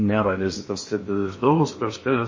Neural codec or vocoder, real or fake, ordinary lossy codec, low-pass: codec, 16 kHz in and 24 kHz out, 0.8 kbps, FocalCodec, streaming, 65536 codes; fake; MP3, 32 kbps; 7.2 kHz